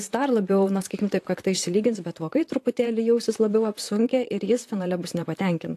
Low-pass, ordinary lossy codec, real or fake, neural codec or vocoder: 14.4 kHz; AAC, 64 kbps; fake; vocoder, 44.1 kHz, 128 mel bands, Pupu-Vocoder